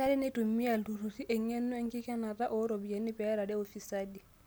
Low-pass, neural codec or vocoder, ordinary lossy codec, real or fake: none; none; none; real